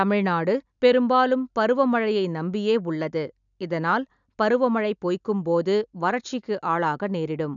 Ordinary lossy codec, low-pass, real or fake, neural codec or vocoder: none; 7.2 kHz; real; none